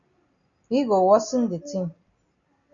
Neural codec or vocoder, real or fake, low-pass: none; real; 7.2 kHz